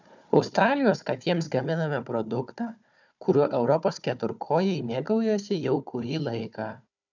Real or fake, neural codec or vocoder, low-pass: fake; codec, 16 kHz, 4 kbps, FunCodec, trained on Chinese and English, 50 frames a second; 7.2 kHz